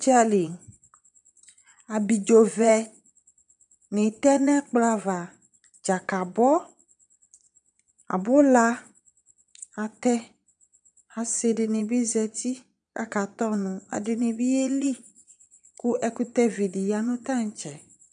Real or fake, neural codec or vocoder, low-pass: fake; vocoder, 22.05 kHz, 80 mel bands, Vocos; 9.9 kHz